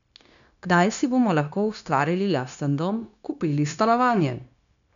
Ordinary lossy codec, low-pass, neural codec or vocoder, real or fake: none; 7.2 kHz; codec, 16 kHz, 0.9 kbps, LongCat-Audio-Codec; fake